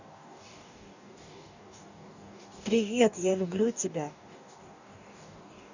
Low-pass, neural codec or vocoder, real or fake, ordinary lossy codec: 7.2 kHz; codec, 44.1 kHz, 2.6 kbps, DAC; fake; none